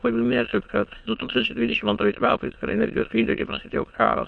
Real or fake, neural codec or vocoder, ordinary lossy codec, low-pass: fake; autoencoder, 22.05 kHz, a latent of 192 numbers a frame, VITS, trained on many speakers; MP3, 48 kbps; 9.9 kHz